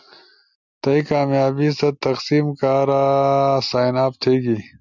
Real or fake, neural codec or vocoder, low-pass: real; none; 7.2 kHz